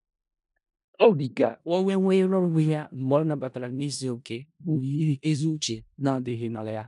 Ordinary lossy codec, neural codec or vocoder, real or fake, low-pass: none; codec, 16 kHz in and 24 kHz out, 0.4 kbps, LongCat-Audio-Codec, four codebook decoder; fake; 10.8 kHz